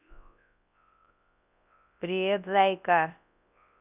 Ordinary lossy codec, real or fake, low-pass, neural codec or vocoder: none; fake; 3.6 kHz; codec, 24 kHz, 0.9 kbps, WavTokenizer, large speech release